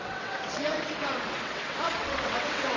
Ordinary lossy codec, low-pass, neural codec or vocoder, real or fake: AAC, 32 kbps; 7.2 kHz; vocoder, 22.05 kHz, 80 mel bands, WaveNeXt; fake